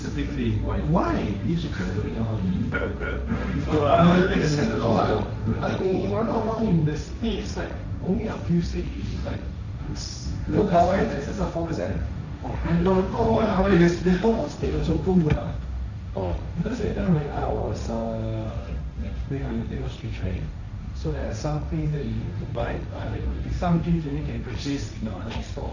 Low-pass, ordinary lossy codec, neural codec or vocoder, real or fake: 7.2 kHz; none; codec, 16 kHz, 1.1 kbps, Voila-Tokenizer; fake